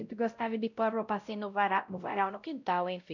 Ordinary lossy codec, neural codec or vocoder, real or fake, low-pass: none; codec, 16 kHz, 0.5 kbps, X-Codec, WavLM features, trained on Multilingual LibriSpeech; fake; 7.2 kHz